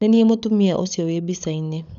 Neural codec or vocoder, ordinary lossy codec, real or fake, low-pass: codec, 16 kHz, 16 kbps, FunCodec, trained on LibriTTS, 50 frames a second; none; fake; 7.2 kHz